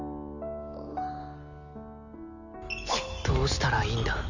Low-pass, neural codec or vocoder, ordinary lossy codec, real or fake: 7.2 kHz; none; none; real